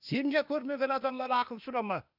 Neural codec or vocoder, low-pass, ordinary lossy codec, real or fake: codec, 16 kHz, 0.8 kbps, ZipCodec; 5.4 kHz; none; fake